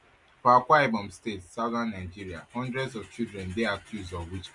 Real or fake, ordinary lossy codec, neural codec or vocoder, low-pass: real; MP3, 64 kbps; none; 10.8 kHz